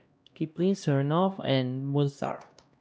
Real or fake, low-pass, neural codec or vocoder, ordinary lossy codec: fake; none; codec, 16 kHz, 1 kbps, X-Codec, HuBERT features, trained on LibriSpeech; none